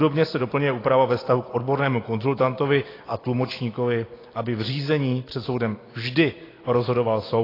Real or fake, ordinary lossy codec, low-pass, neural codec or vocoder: real; AAC, 24 kbps; 5.4 kHz; none